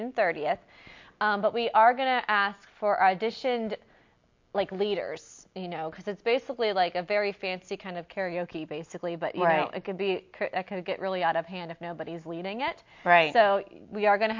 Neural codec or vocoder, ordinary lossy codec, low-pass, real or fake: none; MP3, 48 kbps; 7.2 kHz; real